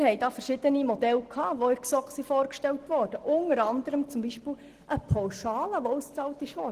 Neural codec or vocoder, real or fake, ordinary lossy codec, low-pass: none; real; Opus, 16 kbps; 14.4 kHz